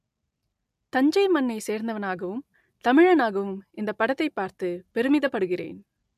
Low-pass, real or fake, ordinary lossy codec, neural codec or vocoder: 14.4 kHz; real; AAC, 96 kbps; none